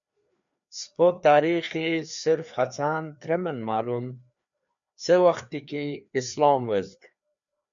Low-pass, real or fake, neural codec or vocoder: 7.2 kHz; fake; codec, 16 kHz, 2 kbps, FreqCodec, larger model